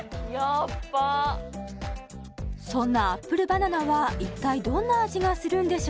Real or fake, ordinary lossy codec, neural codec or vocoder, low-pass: real; none; none; none